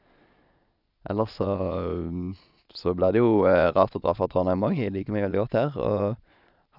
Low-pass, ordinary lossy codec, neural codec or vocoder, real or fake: 5.4 kHz; none; vocoder, 22.05 kHz, 80 mel bands, Vocos; fake